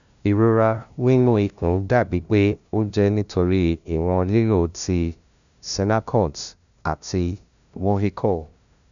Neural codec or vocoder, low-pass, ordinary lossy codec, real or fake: codec, 16 kHz, 0.5 kbps, FunCodec, trained on LibriTTS, 25 frames a second; 7.2 kHz; none; fake